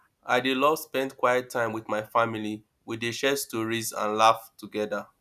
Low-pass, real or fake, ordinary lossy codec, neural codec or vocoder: 14.4 kHz; real; none; none